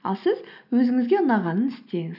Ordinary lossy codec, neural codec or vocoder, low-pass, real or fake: none; none; 5.4 kHz; real